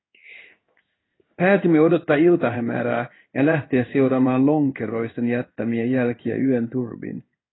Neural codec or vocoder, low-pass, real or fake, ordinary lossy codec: codec, 16 kHz in and 24 kHz out, 1 kbps, XY-Tokenizer; 7.2 kHz; fake; AAC, 16 kbps